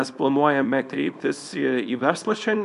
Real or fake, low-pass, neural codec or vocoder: fake; 10.8 kHz; codec, 24 kHz, 0.9 kbps, WavTokenizer, small release